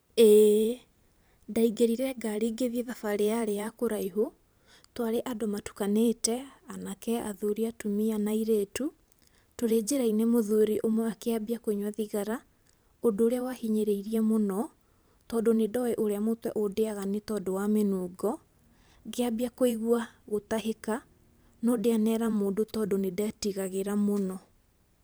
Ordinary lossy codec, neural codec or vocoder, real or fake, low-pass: none; vocoder, 44.1 kHz, 128 mel bands every 512 samples, BigVGAN v2; fake; none